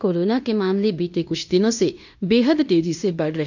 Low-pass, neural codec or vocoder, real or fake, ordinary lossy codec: 7.2 kHz; codec, 16 kHz in and 24 kHz out, 0.9 kbps, LongCat-Audio-Codec, fine tuned four codebook decoder; fake; none